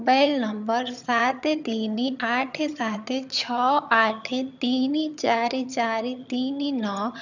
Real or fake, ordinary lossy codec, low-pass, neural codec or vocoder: fake; none; 7.2 kHz; vocoder, 22.05 kHz, 80 mel bands, HiFi-GAN